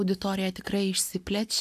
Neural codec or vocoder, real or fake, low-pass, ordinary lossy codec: none; real; 14.4 kHz; AAC, 96 kbps